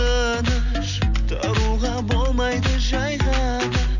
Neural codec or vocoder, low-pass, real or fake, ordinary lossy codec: none; 7.2 kHz; real; none